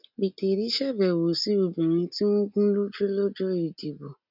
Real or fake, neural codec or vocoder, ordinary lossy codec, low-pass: real; none; none; 5.4 kHz